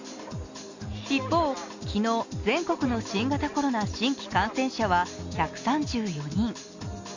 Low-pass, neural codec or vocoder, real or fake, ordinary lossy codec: 7.2 kHz; none; real; Opus, 64 kbps